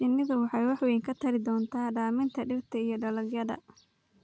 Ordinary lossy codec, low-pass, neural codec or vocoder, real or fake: none; none; none; real